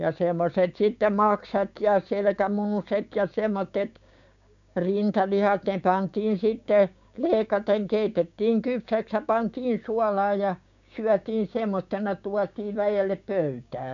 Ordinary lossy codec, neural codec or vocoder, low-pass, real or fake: none; codec, 16 kHz, 6 kbps, DAC; 7.2 kHz; fake